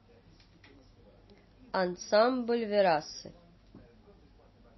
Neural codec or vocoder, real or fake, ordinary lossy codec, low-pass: none; real; MP3, 24 kbps; 7.2 kHz